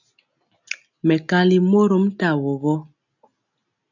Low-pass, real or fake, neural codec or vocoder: 7.2 kHz; real; none